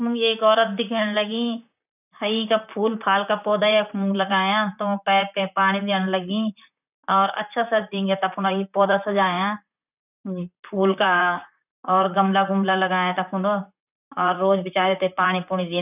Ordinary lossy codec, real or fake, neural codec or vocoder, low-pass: none; fake; vocoder, 44.1 kHz, 128 mel bands, Pupu-Vocoder; 3.6 kHz